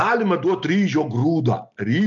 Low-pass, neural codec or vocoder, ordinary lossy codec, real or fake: 7.2 kHz; none; MP3, 64 kbps; real